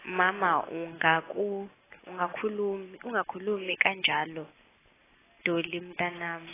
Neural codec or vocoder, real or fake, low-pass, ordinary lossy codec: none; real; 3.6 kHz; AAC, 16 kbps